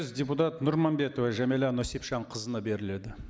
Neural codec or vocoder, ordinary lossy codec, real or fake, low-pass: none; none; real; none